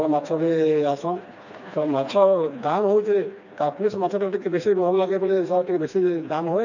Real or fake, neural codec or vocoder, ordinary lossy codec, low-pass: fake; codec, 16 kHz, 2 kbps, FreqCodec, smaller model; none; 7.2 kHz